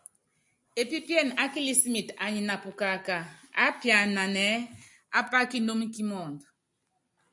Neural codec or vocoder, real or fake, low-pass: none; real; 10.8 kHz